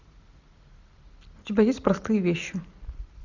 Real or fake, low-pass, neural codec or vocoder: real; 7.2 kHz; none